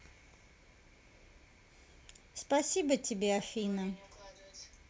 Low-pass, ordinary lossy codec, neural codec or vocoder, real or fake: none; none; none; real